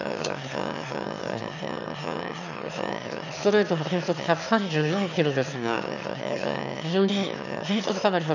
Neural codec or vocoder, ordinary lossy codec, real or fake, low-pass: autoencoder, 22.05 kHz, a latent of 192 numbers a frame, VITS, trained on one speaker; none; fake; 7.2 kHz